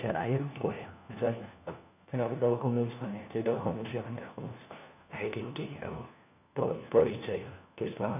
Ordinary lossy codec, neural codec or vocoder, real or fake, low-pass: none; codec, 16 kHz, 1 kbps, FunCodec, trained on LibriTTS, 50 frames a second; fake; 3.6 kHz